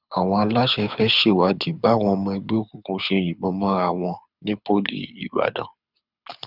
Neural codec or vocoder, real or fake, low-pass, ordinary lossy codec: codec, 24 kHz, 6 kbps, HILCodec; fake; 5.4 kHz; none